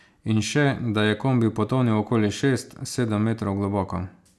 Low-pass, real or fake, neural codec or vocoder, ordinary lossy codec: none; real; none; none